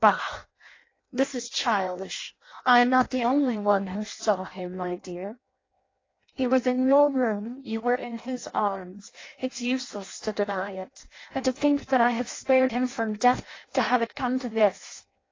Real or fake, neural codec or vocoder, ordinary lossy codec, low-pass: fake; codec, 16 kHz in and 24 kHz out, 0.6 kbps, FireRedTTS-2 codec; AAC, 32 kbps; 7.2 kHz